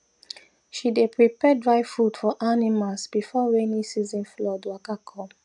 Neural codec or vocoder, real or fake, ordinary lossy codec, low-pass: none; real; none; 10.8 kHz